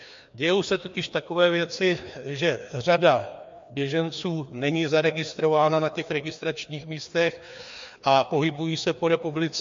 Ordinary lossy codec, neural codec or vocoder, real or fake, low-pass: MP3, 48 kbps; codec, 16 kHz, 2 kbps, FreqCodec, larger model; fake; 7.2 kHz